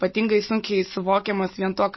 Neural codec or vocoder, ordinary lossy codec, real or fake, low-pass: none; MP3, 24 kbps; real; 7.2 kHz